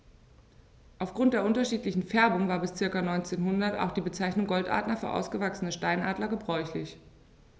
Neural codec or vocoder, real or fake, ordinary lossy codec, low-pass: none; real; none; none